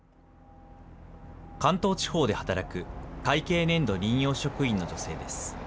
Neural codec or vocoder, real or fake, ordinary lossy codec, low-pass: none; real; none; none